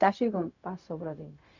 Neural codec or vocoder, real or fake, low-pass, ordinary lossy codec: codec, 16 kHz, 0.4 kbps, LongCat-Audio-Codec; fake; 7.2 kHz; none